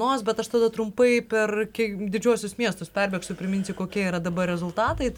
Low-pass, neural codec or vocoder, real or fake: 19.8 kHz; none; real